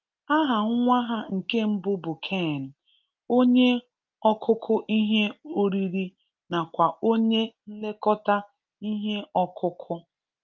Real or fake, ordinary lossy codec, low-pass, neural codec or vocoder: real; Opus, 24 kbps; 7.2 kHz; none